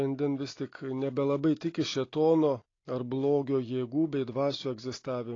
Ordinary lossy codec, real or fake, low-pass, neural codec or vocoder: AAC, 32 kbps; real; 7.2 kHz; none